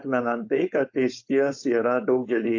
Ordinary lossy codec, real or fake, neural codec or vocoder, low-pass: AAC, 48 kbps; fake; codec, 16 kHz, 4.8 kbps, FACodec; 7.2 kHz